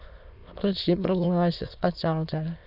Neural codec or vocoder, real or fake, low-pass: autoencoder, 22.05 kHz, a latent of 192 numbers a frame, VITS, trained on many speakers; fake; 5.4 kHz